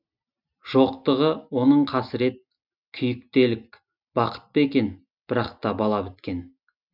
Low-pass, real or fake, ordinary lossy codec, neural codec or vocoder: 5.4 kHz; real; none; none